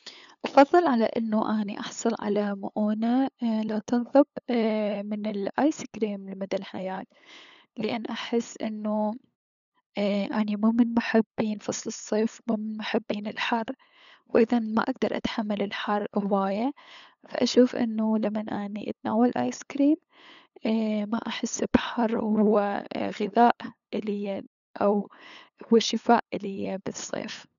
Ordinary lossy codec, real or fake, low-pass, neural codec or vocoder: none; fake; 7.2 kHz; codec, 16 kHz, 8 kbps, FunCodec, trained on LibriTTS, 25 frames a second